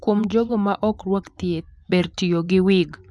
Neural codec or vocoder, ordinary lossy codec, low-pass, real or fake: vocoder, 24 kHz, 100 mel bands, Vocos; none; none; fake